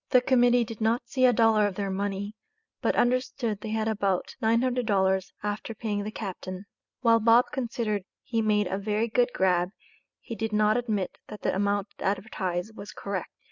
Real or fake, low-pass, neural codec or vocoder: real; 7.2 kHz; none